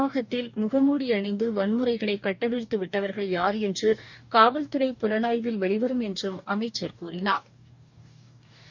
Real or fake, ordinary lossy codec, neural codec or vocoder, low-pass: fake; none; codec, 44.1 kHz, 2.6 kbps, DAC; 7.2 kHz